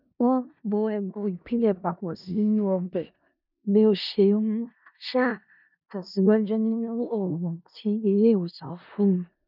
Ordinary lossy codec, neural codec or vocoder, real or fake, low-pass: none; codec, 16 kHz in and 24 kHz out, 0.4 kbps, LongCat-Audio-Codec, four codebook decoder; fake; 5.4 kHz